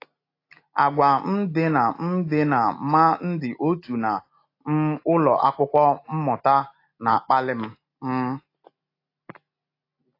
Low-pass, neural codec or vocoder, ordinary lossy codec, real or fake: 5.4 kHz; none; AAC, 32 kbps; real